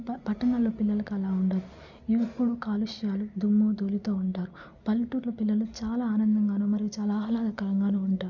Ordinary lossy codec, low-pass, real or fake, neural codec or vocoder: none; 7.2 kHz; real; none